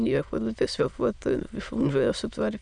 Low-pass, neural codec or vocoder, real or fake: 9.9 kHz; autoencoder, 22.05 kHz, a latent of 192 numbers a frame, VITS, trained on many speakers; fake